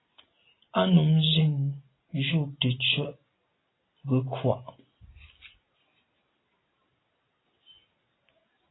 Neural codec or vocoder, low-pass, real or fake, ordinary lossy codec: none; 7.2 kHz; real; AAC, 16 kbps